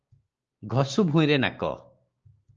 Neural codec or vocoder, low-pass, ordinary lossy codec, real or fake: codec, 16 kHz, 6 kbps, DAC; 7.2 kHz; Opus, 24 kbps; fake